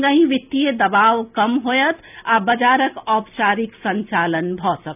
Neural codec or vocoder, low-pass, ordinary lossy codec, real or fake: none; 3.6 kHz; none; real